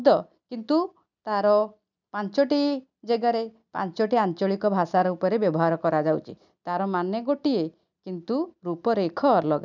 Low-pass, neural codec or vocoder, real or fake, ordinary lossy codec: 7.2 kHz; none; real; none